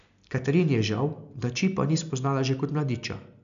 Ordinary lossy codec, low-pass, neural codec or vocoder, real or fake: MP3, 96 kbps; 7.2 kHz; none; real